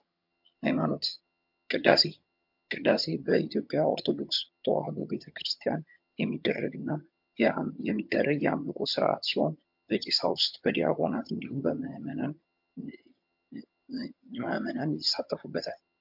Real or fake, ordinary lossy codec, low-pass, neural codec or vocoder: fake; MP3, 48 kbps; 5.4 kHz; vocoder, 22.05 kHz, 80 mel bands, HiFi-GAN